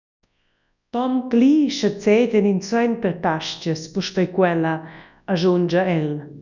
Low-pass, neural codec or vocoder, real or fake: 7.2 kHz; codec, 24 kHz, 0.9 kbps, WavTokenizer, large speech release; fake